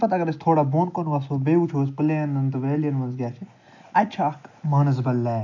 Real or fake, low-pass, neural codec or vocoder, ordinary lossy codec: real; 7.2 kHz; none; MP3, 64 kbps